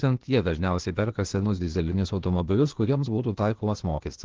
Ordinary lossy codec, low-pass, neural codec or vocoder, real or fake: Opus, 16 kbps; 7.2 kHz; codec, 16 kHz, 0.8 kbps, ZipCodec; fake